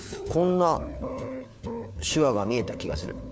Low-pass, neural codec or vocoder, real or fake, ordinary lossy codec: none; codec, 16 kHz, 4 kbps, FunCodec, trained on LibriTTS, 50 frames a second; fake; none